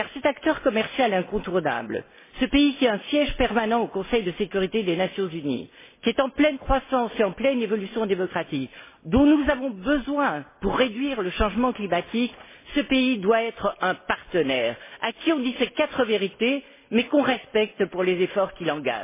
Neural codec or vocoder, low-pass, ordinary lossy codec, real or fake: none; 3.6 kHz; MP3, 16 kbps; real